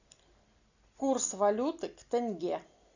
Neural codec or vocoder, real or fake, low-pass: none; real; 7.2 kHz